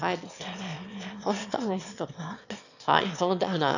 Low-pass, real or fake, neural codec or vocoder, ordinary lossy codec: 7.2 kHz; fake; autoencoder, 22.05 kHz, a latent of 192 numbers a frame, VITS, trained on one speaker; none